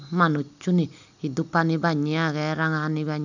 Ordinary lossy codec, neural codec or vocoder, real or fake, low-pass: none; none; real; 7.2 kHz